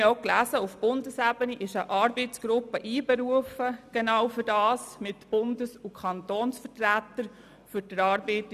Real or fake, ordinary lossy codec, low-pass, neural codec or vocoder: real; none; 14.4 kHz; none